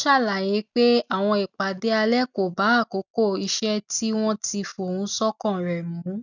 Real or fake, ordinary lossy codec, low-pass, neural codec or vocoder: real; none; 7.2 kHz; none